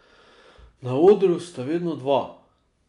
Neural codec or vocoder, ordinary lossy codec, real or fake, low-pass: none; none; real; 10.8 kHz